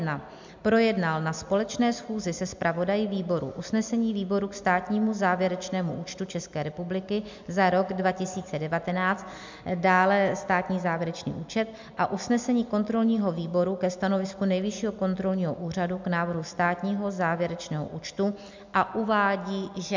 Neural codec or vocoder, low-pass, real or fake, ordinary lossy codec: none; 7.2 kHz; real; MP3, 64 kbps